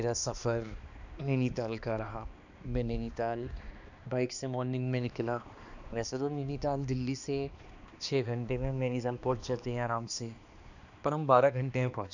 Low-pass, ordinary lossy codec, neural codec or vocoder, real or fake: 7.2 kHz; none; codec, 16 kHz, 2 kbps, X-Codec, HuBERT features, trained on balanced general audio; fake